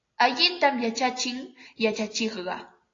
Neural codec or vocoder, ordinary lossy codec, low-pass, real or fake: none; AAC, 32 kbps; 7.2 kHz; real